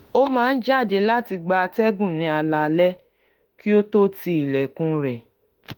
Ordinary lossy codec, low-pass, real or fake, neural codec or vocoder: Opus, 24 kbps; 19.8 kHz; fake; autoencoder, 48 kHz, 32 numbers a frame, DAC-VAE, trained on Japanese speech